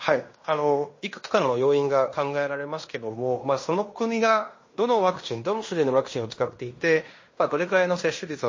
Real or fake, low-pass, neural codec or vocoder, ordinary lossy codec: fake; 7.2 kHz; codec, 16 kHz in and 24 kHz out, 0.9 kbps, LongCat-Audio-Codec, fine tuned four codebook decoder; MP3, 32 kbps